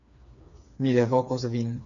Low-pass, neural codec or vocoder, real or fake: 7.2 kHz; codec, 16 kHz, 4 kbps, FreqCodec, smaller model; fake